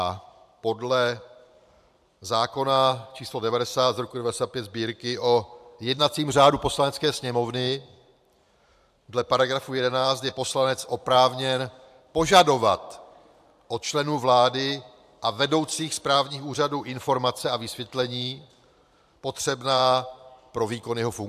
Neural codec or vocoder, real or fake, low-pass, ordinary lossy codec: vocoder, 44.1 kHz, 128 mel bands every 512 samples, BigVGAN v2; fake; 14.4 kHz; AAC, 96 kbps